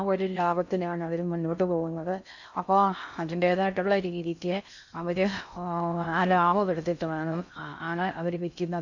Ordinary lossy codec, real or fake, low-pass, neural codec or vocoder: none; fake; 7.2 kHz; codec, 16 kHz in and 24 kHz out, 0.6 kbps, FocalCodec, streaming, 2048 codes